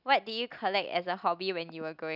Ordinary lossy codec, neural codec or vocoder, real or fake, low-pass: none; none; real; 5.4 kHz